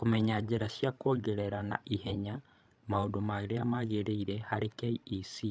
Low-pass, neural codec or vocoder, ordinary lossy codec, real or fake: none; codec, 16 kHz, 16 kbps, FreqCodec, larger model; none; fake